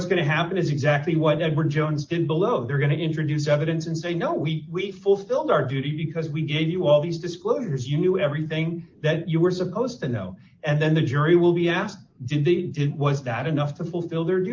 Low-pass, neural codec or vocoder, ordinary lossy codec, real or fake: 7.2 kHz; none; Opus, 24 kbps; real